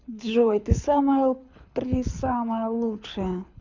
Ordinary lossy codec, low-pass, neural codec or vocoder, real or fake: none; 7.2 kHz; codec, 24 kHz, 6 kbps, HILCodec; fake